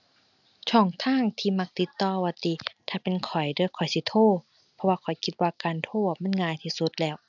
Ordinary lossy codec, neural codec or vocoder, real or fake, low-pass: none; none; real; 7.2 kHz